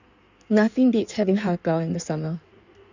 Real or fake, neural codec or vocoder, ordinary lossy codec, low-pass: fake; codec, 16 kHz in and 24 kHz out, 1.1 kbps, FireRedTTS-2 codec; none; 7.2 kHz